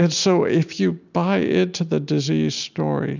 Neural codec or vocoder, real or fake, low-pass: none; real; 7.2 kHz